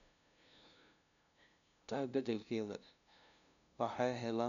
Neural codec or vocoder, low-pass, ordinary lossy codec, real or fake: codec, 16 kHz, 0.5 kbps, FunCodec, trained on LibriTTS, 25 frames a second; 7.2 kHz; none; fake